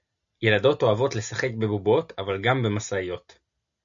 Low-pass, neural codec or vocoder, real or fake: 7.2 kHz; none; real